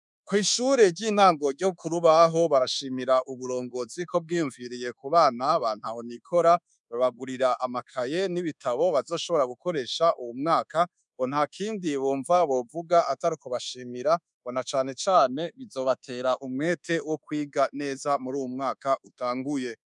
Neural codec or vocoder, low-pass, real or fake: codec, 24 kHz, 1.2 kbps, DualCodec; 10.8 kHz; fake